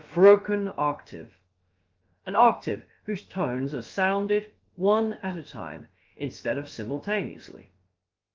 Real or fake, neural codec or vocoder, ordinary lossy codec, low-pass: fake; codec, 16 kHz, about 1 kbps, DyCAST, with the encoder's durations; Opus, 32 kbps; 7.2 kHz